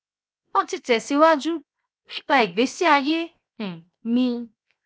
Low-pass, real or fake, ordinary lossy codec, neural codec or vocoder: none; fake; none; codec, 16 kHz, 0.7 kbps, FocalCodec